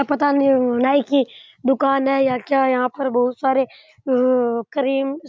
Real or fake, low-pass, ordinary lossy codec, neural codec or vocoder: real; none; none; none